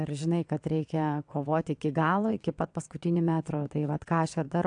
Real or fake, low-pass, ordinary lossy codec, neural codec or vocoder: fake; 9.9 kHz; AAC, 64 kbps; vocoder, 22.05 kHz, 80 mel bands, WaveNeXt